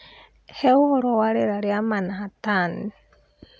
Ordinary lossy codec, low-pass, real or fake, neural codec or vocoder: none; none; real; none